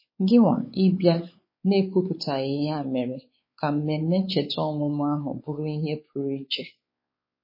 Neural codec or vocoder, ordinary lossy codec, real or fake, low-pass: codec, 16 kHz, 4 kbps, X-Codec, WavLM features, trained on Multilingual LibriSpeech; MP3, 24 kbps; fake; 5.4 kHz